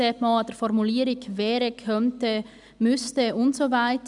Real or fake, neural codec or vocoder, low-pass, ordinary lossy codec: real; none; 10.8 kHz; none